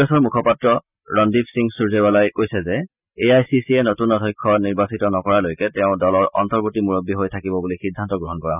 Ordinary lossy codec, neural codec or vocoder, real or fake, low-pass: none; none; real; 3.6 kHz